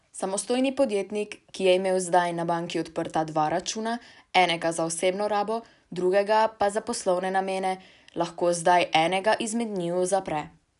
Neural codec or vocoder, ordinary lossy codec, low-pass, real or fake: none; none; 10.8 kHz; real